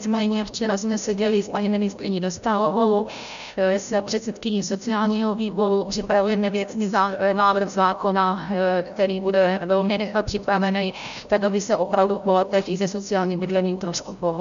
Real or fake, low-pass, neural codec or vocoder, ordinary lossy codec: fake; 7.2 kHz; codec, 16 kHz, 0.5 kbps, FreqCodec, larger model; MP3, 96 kbps